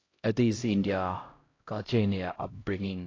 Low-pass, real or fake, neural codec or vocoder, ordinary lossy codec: 7.2 kHz; fake; codec, 16 kHz, 0.5 kbps, X-Codec, HuBERT features, trained on LibriSpeech; AAC, 32 kbps